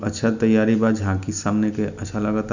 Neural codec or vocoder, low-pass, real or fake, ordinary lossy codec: none; 7.2 kHz; real; none